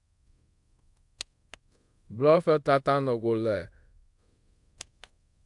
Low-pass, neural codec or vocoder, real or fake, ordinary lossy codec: 10.8 kHz; codec, 24 kHz, 0.5 kbps, DualCodec; fake; AAC, 64 kbps